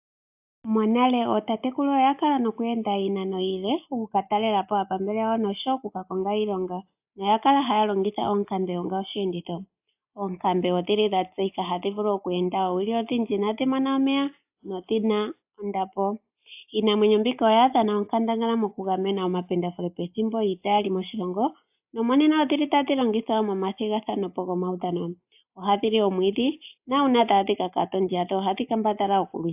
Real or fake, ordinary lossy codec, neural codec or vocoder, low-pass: real; AAC, 32 kbps; none; 3.6 kHz